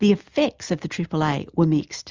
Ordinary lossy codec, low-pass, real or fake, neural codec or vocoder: Opus, 32 kbps; 7.2 kHz; real; none